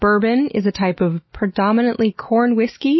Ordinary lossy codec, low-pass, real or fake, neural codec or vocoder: MP3, 24 kbps; 7.2 kHz; real; none